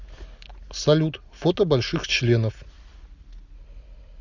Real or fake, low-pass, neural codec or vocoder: real; 7.2 kHz; none